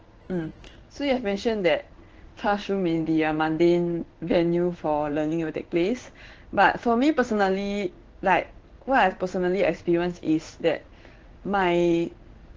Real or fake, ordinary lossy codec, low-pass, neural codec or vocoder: fake; Opus, 16 kbps; 7.2 kHz; codec, 16 kHz in and 24 kHz out, 1 kbps, XY-Tokenizer